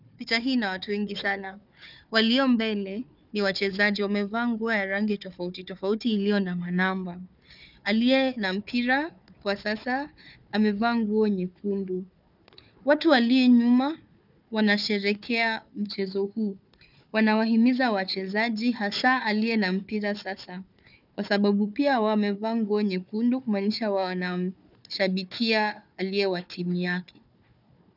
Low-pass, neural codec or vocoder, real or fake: 5.4 kHz; codec, 16 kHz, 4 kbps, FunCodec, trained on Chinese and English, 50 frames a second; fake